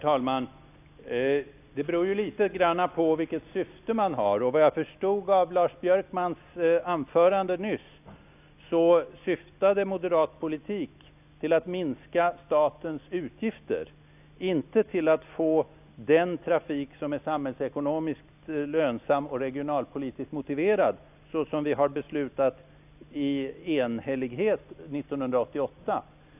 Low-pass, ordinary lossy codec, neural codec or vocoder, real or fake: 3.6 kHz; none; none; real